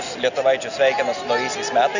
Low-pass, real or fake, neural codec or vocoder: 7.2 kHz; real; none